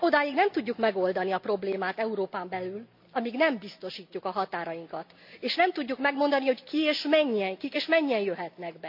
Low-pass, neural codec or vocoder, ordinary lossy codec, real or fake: 5.4 kHz; none; MP3, 48 kbps; real